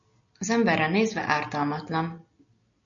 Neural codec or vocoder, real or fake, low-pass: none; real; 7.2 kHz